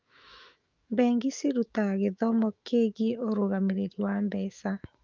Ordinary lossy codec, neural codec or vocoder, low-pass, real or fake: Opus, 24 kbps; autoencoder, 48 kHz, 128 numbers a frame, DAC-VAE, trained on Japanese speech; 7.2 kHz; fake